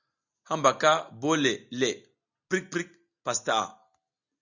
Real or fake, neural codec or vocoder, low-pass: real; none; 7.2 kHz